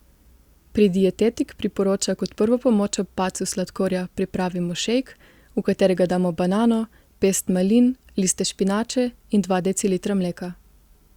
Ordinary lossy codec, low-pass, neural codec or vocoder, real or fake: Opus, 64 kbps; 19.8 kHz; none; real